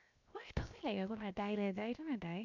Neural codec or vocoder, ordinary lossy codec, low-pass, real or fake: codec, 16 kHz, 0.7 kbps, FocalCodec; none; 7.2 kHz; fake